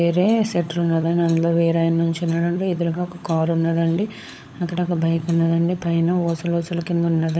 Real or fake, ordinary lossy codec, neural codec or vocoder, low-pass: fake; none; codec, 16 kHz, 16 kbps, FunCodec, trained on LibriTTS, 50 frames a second; none